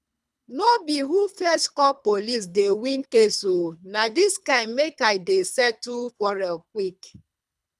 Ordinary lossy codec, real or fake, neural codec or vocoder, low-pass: none; fake; codec, 24 kHz, 3 kbps, HILCodec; none